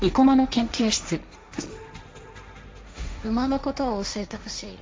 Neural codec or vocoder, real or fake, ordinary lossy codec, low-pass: codec, 16 kHz, 1.1 kbps, Voila-Tokenizer; fake; none; none